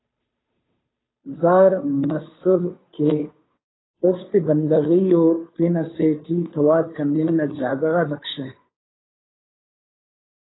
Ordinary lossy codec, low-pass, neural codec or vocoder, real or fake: AAC, 16 kbps; 7.2 kHz; codec, 16 kHz, 2 kbps, FunCodec, trained on Chinese and English, 25 frames a second; fake